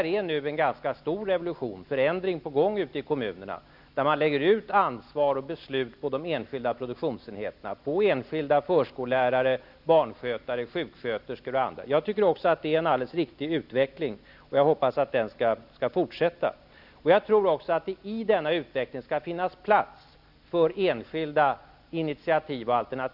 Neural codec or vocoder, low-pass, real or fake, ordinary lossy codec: none; 5.4 kHz; real; none